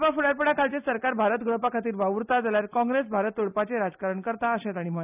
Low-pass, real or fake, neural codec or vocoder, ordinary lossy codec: 3.6 kHz; real; none; none